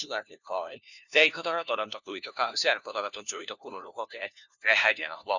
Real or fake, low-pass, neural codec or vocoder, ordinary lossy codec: fake; 7.2 kHz; codec, 16 kHz, 1 kbps, FunCodec, trained on LibriTTS, 50 frames a second; none